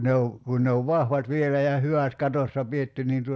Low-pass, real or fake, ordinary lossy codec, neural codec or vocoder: none; real; none; none